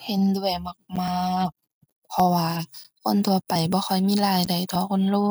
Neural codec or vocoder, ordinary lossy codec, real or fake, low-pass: none; none; real; none